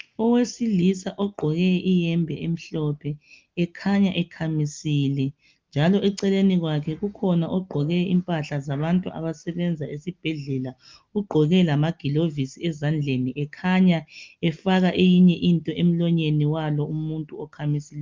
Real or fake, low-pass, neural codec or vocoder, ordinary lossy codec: real; 7.2 kHz; none; Opus, 32 kbps